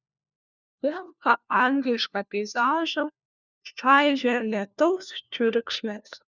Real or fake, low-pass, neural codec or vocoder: fake; 7.2 kHz; codec, 16 kHz, 1 kbps, FunCodec, trained on LibriTTS, 50 frames a second